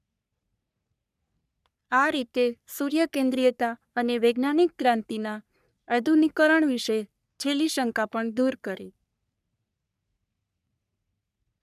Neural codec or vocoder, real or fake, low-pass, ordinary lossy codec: codec, 44.1 kHz, 3.4 kbps, Pupu-Codec; fake; 14.4 kHz; none